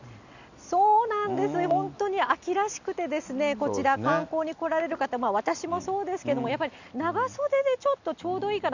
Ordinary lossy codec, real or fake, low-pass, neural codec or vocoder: none; real; 7.2 kHz; none